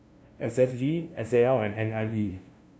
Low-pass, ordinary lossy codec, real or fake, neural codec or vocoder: none; none; fake; codec, 16 kHz, 0.5 kbps, FunCodec, trained on LibriTTS, 25 frames a second